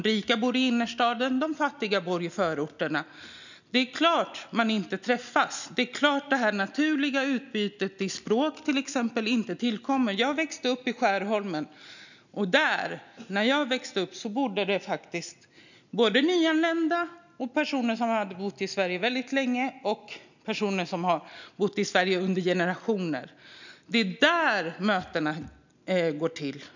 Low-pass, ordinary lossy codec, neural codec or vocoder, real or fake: 7.2 kHz; none; none; real